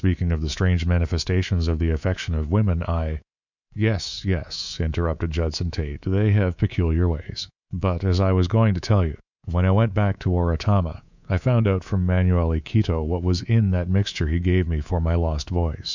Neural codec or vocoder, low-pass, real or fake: codec, 24 kHz, 3.1 kbps, DualCodec; 7.2 kHz; fake